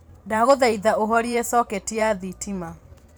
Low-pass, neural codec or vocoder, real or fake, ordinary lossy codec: none; vocoder, 44.1 kHz, 128 mel bands every 512 samples, BigVGAN v2; fake; none